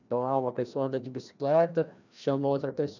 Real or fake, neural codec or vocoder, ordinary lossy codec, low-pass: fake; codec, 16 kHz, 1 kbps, FreqCodec, larger model; none; 7.2 kHz